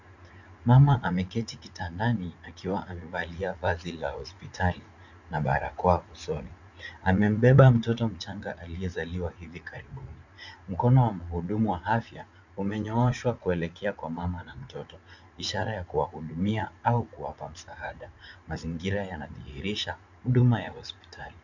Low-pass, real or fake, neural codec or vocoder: 7.2 kHz; fake; vocoder, 44.1 kHz, 80 mel bands, Vocos